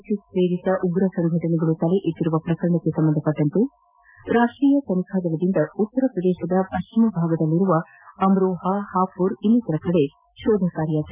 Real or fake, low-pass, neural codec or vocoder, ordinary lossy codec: real; 3.6 kHz; none; none